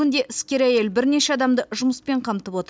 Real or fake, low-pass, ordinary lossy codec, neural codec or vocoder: real; none; none; none